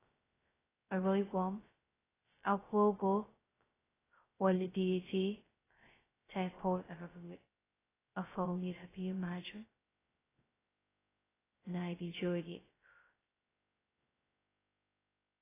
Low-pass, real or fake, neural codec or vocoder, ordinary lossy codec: 3.6 kHz; fake; codec, 16 kHz, 0.2 kbps, FocalCodec; AAC, 16 kbps